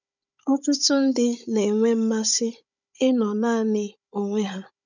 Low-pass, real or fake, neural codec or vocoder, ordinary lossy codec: 7.2 kHz; fake; codec, 16 kHz, 16 kbps, FunCodec, trained on Chinese and English, 50 frames a second; none